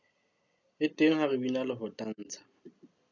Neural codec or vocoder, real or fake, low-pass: none; real; 7.2 kHz